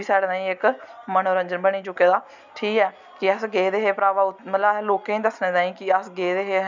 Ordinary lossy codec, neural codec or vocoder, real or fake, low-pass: none; none; real; 7.2 kHz